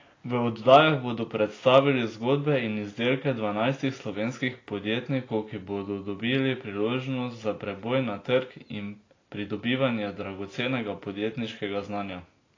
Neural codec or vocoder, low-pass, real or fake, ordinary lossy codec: none; 7.2 kHz; real; AAC, 32 kbps